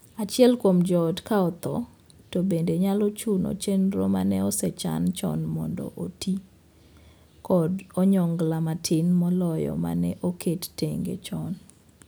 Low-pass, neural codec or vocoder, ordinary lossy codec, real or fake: none; none; none; real